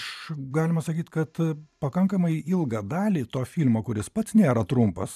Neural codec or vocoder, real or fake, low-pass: vocoder, 44.1 kHz, 128 mel bands every 512 samples, BigVGAN v2; fake; 14.4 kHz